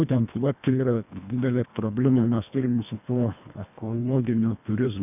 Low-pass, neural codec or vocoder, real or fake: 3.6 kHz; codec, 24 kHz, 1.5 kbps, HILCodec; fake